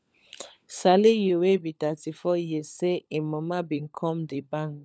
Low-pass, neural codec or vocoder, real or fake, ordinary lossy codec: none; codec, 16 kHz, 16 kbps, FunCodec, trained on LibriTTS, 50 frames a second; fake; none